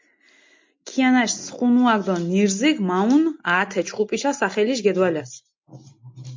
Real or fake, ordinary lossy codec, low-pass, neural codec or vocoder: real; MP3, 48 kbps; 7.2 kHz; none